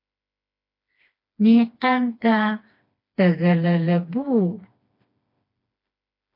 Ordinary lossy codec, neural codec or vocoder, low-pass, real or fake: MP3, 32 kbps; codec, 16 kHz, 2 kbps, FreqCodec, smaller model; 5.4 kHz; fake